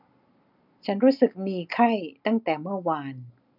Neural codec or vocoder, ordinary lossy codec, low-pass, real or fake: none; none; 5.4 kHz; real